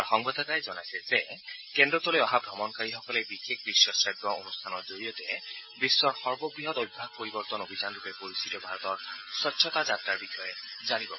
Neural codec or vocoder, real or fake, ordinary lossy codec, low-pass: none; real; MP3, 24 kbps; 7.2 kHz